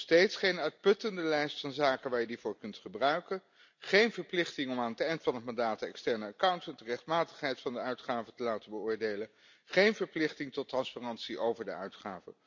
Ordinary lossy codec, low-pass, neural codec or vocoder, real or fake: MP3, 64 kbps; 7.2 kHz; none; real